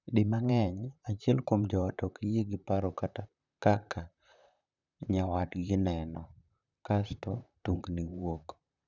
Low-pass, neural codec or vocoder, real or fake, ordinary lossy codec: 7.2 kHz; vocoder, 44.1 kHz, 128 mel bands, Pupu-Vocoder; fake; none